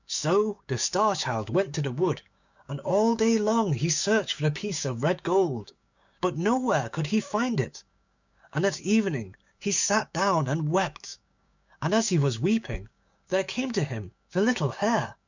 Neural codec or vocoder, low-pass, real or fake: codec, 16 kHz, 6 kbps, DAC; 7.2 kHz; fake